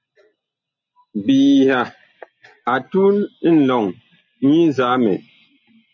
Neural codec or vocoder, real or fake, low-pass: none; real; 7.2 kHz